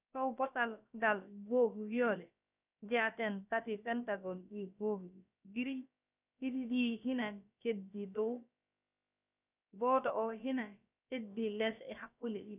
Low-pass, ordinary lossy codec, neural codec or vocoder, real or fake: 3.6 kHz; none; codec, 16 kHz, about 1 kbps, DyCAST, with the encoder's durations; fake